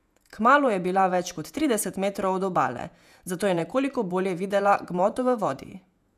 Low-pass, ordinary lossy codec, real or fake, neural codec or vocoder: 14.4 kHz; none; fake; vocoder, 48 kHz, 128 mel bands, Vocos